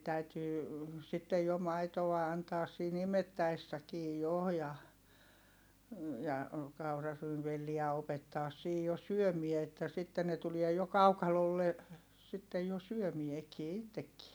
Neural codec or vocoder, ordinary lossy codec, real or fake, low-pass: none; none; real; none